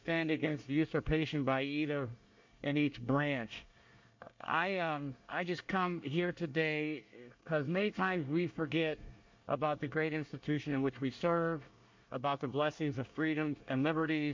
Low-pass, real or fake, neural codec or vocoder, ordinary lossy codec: 7.2 kHz; fake; codec, 24 kHz, 1 kbps, SNAC; MP3, 48 kbps